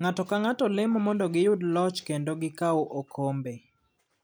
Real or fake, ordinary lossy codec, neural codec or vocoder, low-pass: real; none; none; none